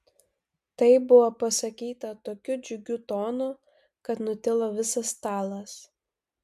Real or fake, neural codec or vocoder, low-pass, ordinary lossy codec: real; none; 14.4 kHz; MP3, 96 kbps